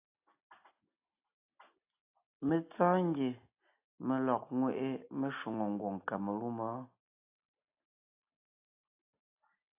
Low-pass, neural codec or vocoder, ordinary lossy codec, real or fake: 3.6 kHz; none; AAC, 32 kbps; real